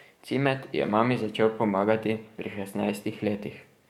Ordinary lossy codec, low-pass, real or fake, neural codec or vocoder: MP3, 96 kbps; 19.8 kHz; fake; codec, 44.1 kHz, 7.8 kbps, DAC